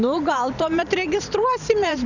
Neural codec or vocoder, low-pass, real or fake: vocoder, 44.1 kHz, 128 mel bands every 512 samples, BigVGAN v2; 7.2 kHz; fake